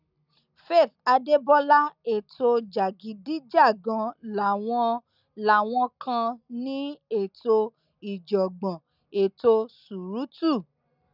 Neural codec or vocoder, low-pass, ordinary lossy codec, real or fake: none; 5.4 kHz; none; real